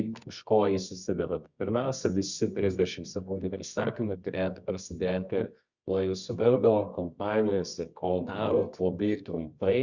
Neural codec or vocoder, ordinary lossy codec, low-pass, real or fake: codec, 24 kHz, 0.9 kbps, WavTokenizer, medium music audio release; Opus, 64 kbps; 7.2 kHz; fake